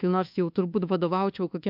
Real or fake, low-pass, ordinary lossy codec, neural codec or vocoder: fake; 5.4 kHz; MP3, 48 kbps; codec, 24 kHz, 1.2 kbps, DualCodec